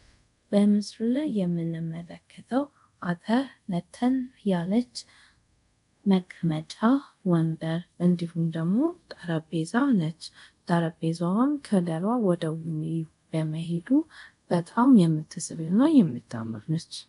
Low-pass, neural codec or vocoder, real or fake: 10.8 kHz; codec, 24 kHz, 0.5 kbps, DualCodec; fake